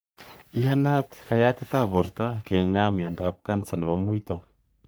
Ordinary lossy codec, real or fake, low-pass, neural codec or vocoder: none; fake; none; codec, 44.1 kHz, 3.4 kbps, Pupu-Codec